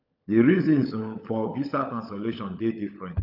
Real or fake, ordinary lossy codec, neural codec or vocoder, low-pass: fake; none; codec, 16 kHz, 16 kbps, FunCodec, trained on LibriTTS, 50 frames a second; 5.4 kHz